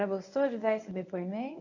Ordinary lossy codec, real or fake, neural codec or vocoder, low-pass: none; fake; codec, 24 kHz, 0.9 kbps, WavTokenizer, medium speech release version 1; 7.2 kHz